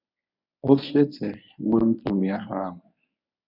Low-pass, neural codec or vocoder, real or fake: 5.4 kHz; codec, 24 kHz, 0.9 kbps, WavTokenizer, medium speech release version 1; fake